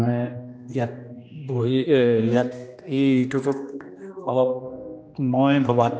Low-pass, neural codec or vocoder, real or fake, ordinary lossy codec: none; codec, 16 kHz, 1 kbps, X-Codec, HuBERT features, trained on general audio; fake; none